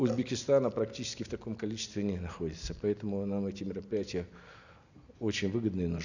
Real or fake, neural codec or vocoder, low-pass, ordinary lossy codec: real; none; 7.2 kHz; none